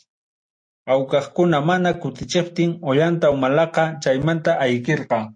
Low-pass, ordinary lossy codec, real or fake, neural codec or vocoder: 9.9 kHz; MP3, 48 kbps; real; none